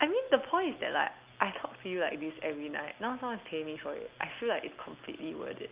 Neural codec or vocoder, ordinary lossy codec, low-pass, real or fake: none; Opus, 32 kbps; 3.6 kHz; real